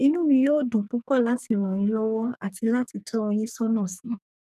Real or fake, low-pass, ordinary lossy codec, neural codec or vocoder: fake; 14.4 kHz; none; codec, 44.1 kHz, 2.6 kbps, SNAC